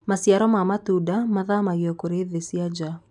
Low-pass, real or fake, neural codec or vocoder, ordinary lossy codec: 10.8 kHz; real; none; none